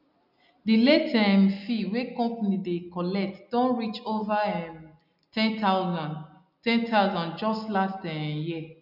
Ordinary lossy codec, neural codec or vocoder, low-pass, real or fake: none; none; 5.4 kHz; real